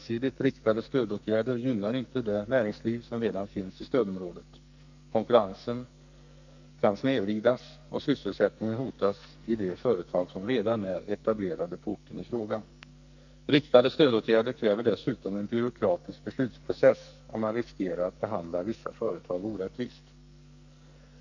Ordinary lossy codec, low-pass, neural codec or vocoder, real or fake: none; 7.2 kHz; codec, 44.1 kHz, 2.6 kbps, SNAC; fake